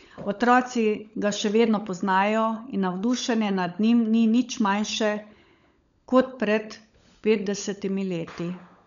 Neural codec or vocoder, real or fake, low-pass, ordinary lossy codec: codec, 16 kHz, 16 kbps, FunCodec, trained on LibriTTS, 50 frames a second; fake; 7.2 kHz; none